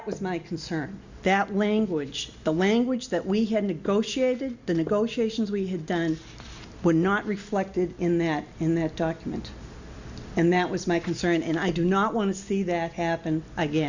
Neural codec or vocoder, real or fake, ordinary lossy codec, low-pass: none; real; Opus, 64 kbps; 7.2 kHz